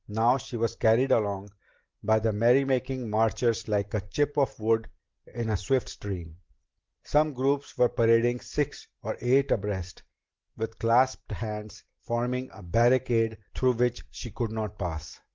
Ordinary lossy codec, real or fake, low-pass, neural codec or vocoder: Opus, 16 kbps; real; 7.2 kHz; none